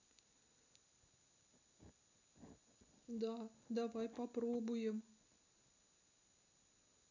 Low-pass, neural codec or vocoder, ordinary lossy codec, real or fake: 7.2 kHz; none; none; real